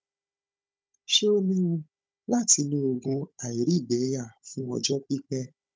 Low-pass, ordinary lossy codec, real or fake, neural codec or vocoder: none; none; fake; codec, 16 kHz, 16 kbps, FunCodec, trained on Chinese and English, 50 frames a second